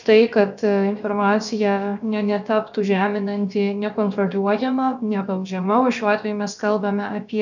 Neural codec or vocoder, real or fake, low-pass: codec, 16 kHz, about 1 kbps, DyCAST, with the encoder's durations; fake; 7.2 kHz